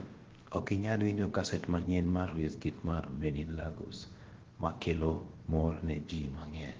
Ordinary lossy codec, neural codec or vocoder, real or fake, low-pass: Opus, 16 kbps; codec, 16 kHz, about 1 kbps, DyCAST, with the encoder's durations; fake; 7.2 kHz